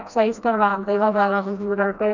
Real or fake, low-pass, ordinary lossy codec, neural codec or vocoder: fake; 7.2 kHz; none; codec, 16 kHz, 1 kbps, FreqCodec, smaller model